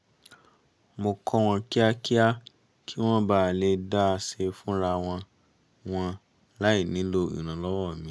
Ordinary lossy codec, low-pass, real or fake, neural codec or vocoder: none; none; real; none